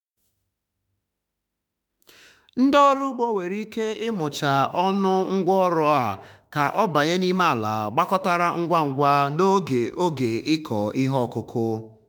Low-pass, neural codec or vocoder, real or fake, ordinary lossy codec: none; autoencoder, 48 kHz, 32 numbers a frame, DAC-VAE, trained on Japanese speech; fake; none